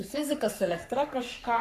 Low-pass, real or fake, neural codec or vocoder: 14.4 kHz; fake; codec, 44.1 kHz, 3.4 kbps, Pupu-Codec